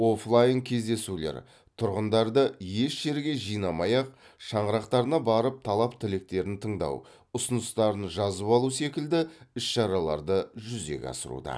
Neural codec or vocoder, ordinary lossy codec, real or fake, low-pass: none; none; real; none